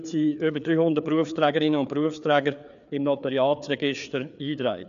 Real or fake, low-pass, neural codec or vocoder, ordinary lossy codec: fake; 7.2 kHz; codec, 16 kHz, 4 kbps, FreqCodec, larger model; none